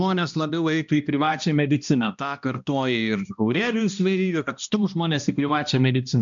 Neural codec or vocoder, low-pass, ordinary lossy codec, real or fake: codec, 16 kHz, 1 kbps, X-Codec, HuBERT features, trained on balanced general audio; 7.2 kHz; MP3, 64 kbps; fake